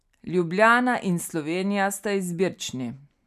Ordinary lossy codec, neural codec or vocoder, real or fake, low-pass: none; none; real; 14.4 kHz